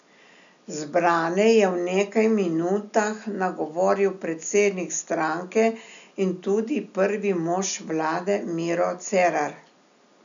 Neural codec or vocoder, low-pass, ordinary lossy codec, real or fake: none; 7.2 kHz; none; real